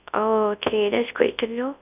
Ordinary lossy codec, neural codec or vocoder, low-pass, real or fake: none; codec, 24 kHz, 0.9 kbps, WavTokenizer, large speech release; 3.6 kHz; fake